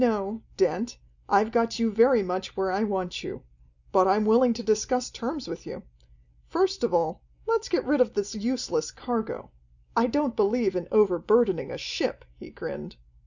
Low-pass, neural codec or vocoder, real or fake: 7.2 kHz; none; real